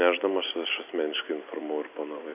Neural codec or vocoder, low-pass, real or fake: none; 3.6 kHz; real